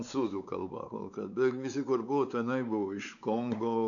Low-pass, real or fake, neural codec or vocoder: 7.2 kHz; fake; codec, 16 kHz, 4 kbps, X-Codec, WavLM features, trained on Multilingual LibriSpeech